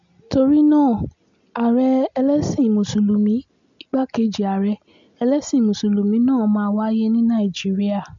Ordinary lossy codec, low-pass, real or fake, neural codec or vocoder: MP3, 64 kbps; 7.2 kHz; real; none